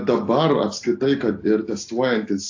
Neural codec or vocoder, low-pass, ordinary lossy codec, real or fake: none; 7.2 kHz; AAC, 48 kbps; real